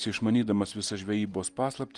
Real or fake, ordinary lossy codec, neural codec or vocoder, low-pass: real; Opus, 24 kbps; none; 10.8 kHz